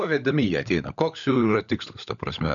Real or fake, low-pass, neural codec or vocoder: fake; 7.2 kHz; codec, 16 kHz, 16 kbps, FunCodec, trained on LibriTTS, 50 frames a second